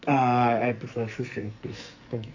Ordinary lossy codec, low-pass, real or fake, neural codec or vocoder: AAC, 48 kbps; 7.2 kHz; fake; codec, 44.1 kHz, 2.6 kbps, SNAC